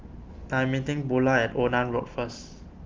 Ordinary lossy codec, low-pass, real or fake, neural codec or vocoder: Opus, 32 kbps; 7.2 kHz; real; none